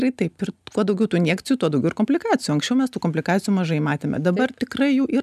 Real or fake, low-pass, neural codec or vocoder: real; 14.4 kHz; none